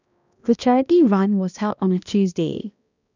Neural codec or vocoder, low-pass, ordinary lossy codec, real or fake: codec, 16 kHz, 1 kbps, X-Codec, HuBERT features, trained on balanced general audio; 7.2 kHz; none; fake